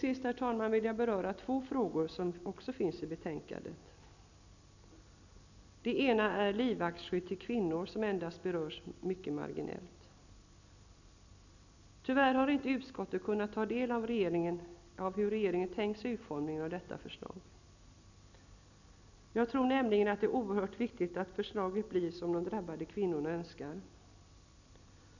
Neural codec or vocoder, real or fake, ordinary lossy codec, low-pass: none; real; none; 7.2 kHz